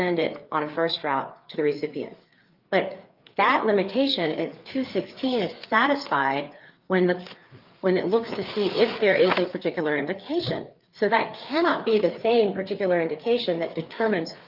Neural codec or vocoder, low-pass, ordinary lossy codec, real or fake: codec, 16 kHz, 4 kbps, FreqCodec, larger model; 5.4 kHz; Opus, 24 kbps; fake